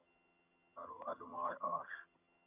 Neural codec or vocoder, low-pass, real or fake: vocoder, 22.05 kHz, 80 mel bands, HiFi-GAN; 3.6 kHz; fake